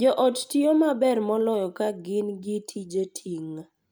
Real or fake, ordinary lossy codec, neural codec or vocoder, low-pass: real; none; none; none